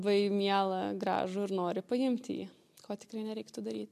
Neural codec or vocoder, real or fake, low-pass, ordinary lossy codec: none; real; 14.4 kHz; MP3, 64 kbps